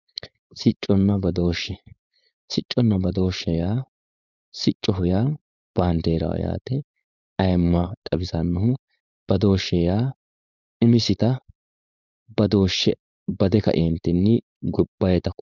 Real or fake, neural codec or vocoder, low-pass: fake; codec, 16 kHz, 4.8 kbps, FACodec; 7.2 kHz